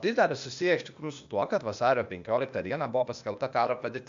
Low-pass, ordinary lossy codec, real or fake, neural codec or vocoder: 7.2 kHz; AAC, 64 kbps; fake; codec, 16 kHz, 0.8 kbps, ZipCodec